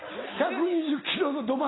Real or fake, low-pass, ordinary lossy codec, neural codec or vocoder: real; 7.2 kHz; AAC, 16 kbps; none